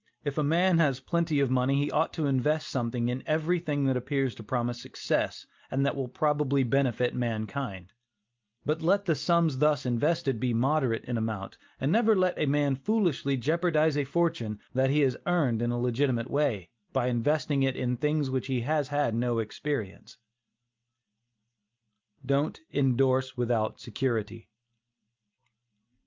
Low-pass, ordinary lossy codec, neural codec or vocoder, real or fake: 7.2 kHz; Opus, 24 kbps; none; real